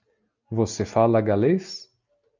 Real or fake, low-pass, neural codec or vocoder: real; 7.2 kHz; none